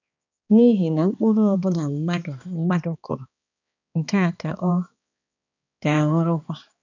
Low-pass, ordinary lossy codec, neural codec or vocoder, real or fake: 7.2 kHz; none; codec, 16 kHz, 2 kbps, X-Codec, HuBERT features, trained on balanced general audio; fake